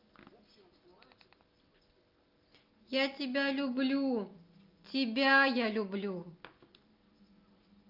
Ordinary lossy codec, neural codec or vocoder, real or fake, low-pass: Opus, 24 kbps; none; real; 5.4 kHz